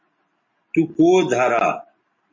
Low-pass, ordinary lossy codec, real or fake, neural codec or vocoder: 7.2 kHz; MP3, 32 kbps; real; none